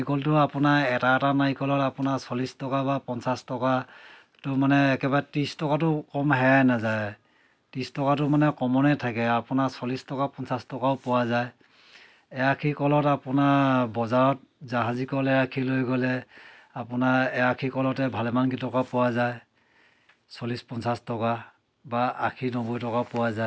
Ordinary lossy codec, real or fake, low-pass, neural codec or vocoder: none; real; none; none